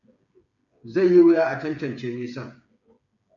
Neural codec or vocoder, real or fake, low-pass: codec, 16 kHz, 8 kbps, FreqCodec, smaller model; fake; 7.2 kHz